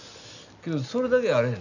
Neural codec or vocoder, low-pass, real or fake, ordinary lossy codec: none; 7.2 kHz; real; none